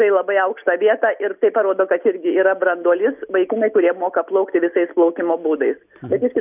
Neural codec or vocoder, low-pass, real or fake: none; 3.6 kHz; real